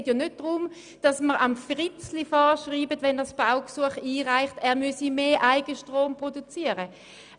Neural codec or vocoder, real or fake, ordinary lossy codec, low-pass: none; real; none; 9.9 kHz